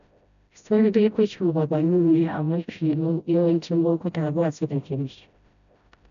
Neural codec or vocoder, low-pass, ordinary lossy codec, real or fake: codec, 16 kHz, 0.5 kbps, FreqCodec, smaller model; 7.2 kHz; none; fake